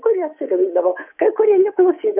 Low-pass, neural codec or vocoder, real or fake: 3.6 kHz; codec, 24 kHz, 3.1 kbps, DualCodec; fake